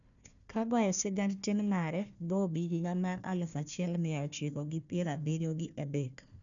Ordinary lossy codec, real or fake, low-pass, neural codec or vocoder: none; fake; 7.2 kHz; codec, 16 kHz, 1 kbps, FunCodec, trained on Chinese and English, 50 frames a second